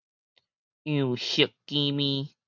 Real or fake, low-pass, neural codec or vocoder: real; 7.2 kHz; none